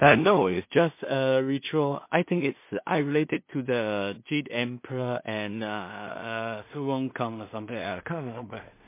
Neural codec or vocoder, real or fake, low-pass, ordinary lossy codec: codec, 16 kHz in and 24 kHz out, 0.4 kbps, LongCat-Audio-Codec, two codebook decoder; fake; 3.6 kHz; MP3, 24 kbps